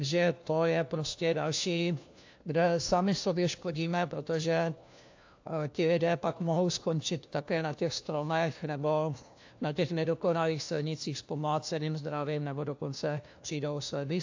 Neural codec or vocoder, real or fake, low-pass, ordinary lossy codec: codec, 16 kHz, 1 kbps, FunCodec, trained on LibriTTS, 50 frames a second; fake; 7.2 kHz; AAC, 48 kbps